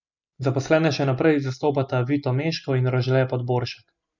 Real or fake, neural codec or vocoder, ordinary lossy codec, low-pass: real; none; none; 7.2 kHz